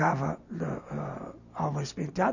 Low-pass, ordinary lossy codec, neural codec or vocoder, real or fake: 7.2 kHz; none; none; real